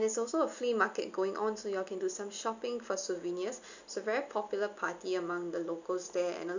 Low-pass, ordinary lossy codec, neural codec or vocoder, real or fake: 7.2 kHz; none; none; real